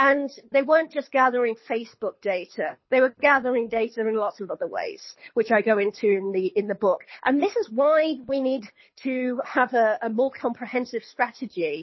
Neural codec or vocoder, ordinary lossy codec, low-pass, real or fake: codec, 24 kHz, 6 kbps, HILCodec; MP3, 24 kbps; 7.2 kHz; fake